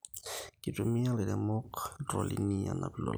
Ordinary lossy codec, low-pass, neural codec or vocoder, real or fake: none; none; none; real